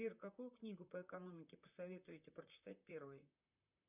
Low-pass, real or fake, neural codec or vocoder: 3.6 kHz; fake; vocoder, 44.1 kHz, 128 mel bands, Pupu-Vocoder